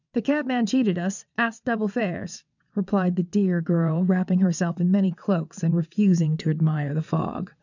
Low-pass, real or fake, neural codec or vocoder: 7.2 kHz; fake; vocoder, 22.05 kHz, 80 mel bands, WaveNeXt